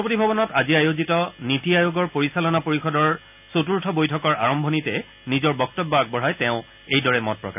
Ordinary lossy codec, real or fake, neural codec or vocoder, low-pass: none; real; none; 3.6 kHz